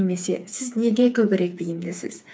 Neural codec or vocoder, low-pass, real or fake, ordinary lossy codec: codec, 16 kHz, 4 kbps, FreqCodec, smaller model; none; fake; none